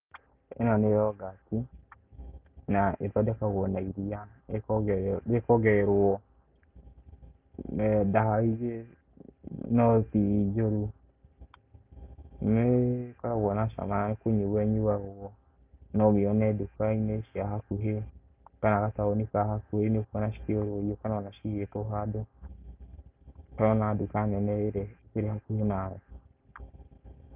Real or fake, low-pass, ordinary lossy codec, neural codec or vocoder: real; 3.6 kHz; Opus, 24 kbps; none